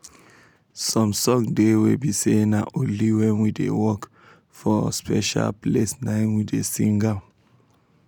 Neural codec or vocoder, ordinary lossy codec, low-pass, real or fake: none; none; none; real